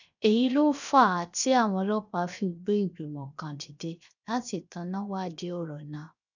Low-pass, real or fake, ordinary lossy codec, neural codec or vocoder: 7.2 kHz; fake; none; codec, 16 kHz, 0.7 kbps, FocalCodec